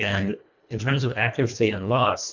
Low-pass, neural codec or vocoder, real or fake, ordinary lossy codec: 7.2 kHz; codec, 24 kHz, 1.5 kbps, HILCodec; fake; MP3, 64 kbps